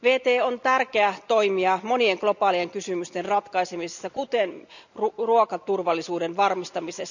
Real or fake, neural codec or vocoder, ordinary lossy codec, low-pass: real; none; none; 7.2 kHz